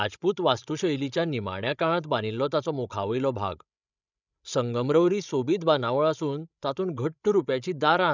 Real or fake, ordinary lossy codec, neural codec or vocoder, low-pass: fake; none; codec, 16 kHz, 16 kbps, FreqCodec, larger model; 7.2 kHz